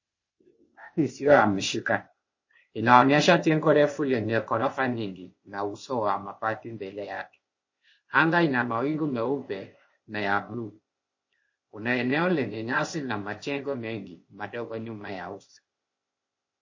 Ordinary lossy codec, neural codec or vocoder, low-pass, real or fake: MP3, 32 kbps; codec, 16 kHz, 0.8 kbps, ZipCodec; 7.2 kHz; fake